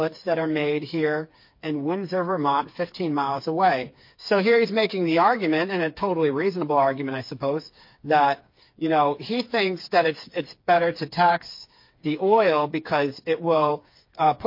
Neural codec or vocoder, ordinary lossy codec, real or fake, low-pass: codec, 16 kHz, 4 kbps, FreqCodec, smaller model; MP3, 32 kbps; fake; 5.4 kHz